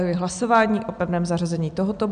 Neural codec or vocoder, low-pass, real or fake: none; 9.9 kHz; real